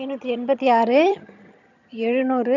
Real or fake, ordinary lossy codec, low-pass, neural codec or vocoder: fake; none; 7.2 kHz; vocoder, 22.05 kHz, 80 mel bands, HiFi-GAN